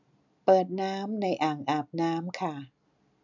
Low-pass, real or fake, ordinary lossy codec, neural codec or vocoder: 7.2 kHz; real; none; none